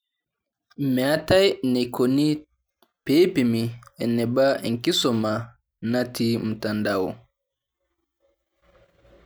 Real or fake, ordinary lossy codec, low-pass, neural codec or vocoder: real; none; none; none